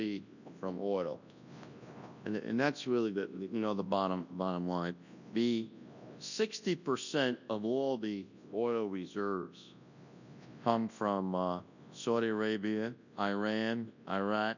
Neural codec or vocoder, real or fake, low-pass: codec, 24 kHz, 0.9 kbps, WavTokenizer, large speech release; fake; 7.2 kHz